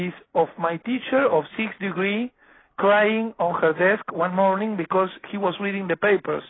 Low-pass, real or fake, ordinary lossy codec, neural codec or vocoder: 7.2 kHz; real; AAC, 16 kbps; none